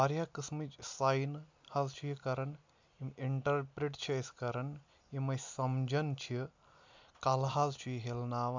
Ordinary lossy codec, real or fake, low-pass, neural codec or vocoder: MP3, 64 kbps; real; 7.2 kHz; none